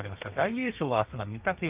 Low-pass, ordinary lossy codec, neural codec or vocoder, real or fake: 3.6 kHz; Opus, 24 kbps; codec, 32 kHz, 1.9 kbps, SNAC; fake